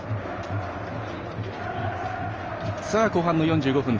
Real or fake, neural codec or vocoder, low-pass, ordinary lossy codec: real; none; 7.2 kHz; Opus, 24 kbps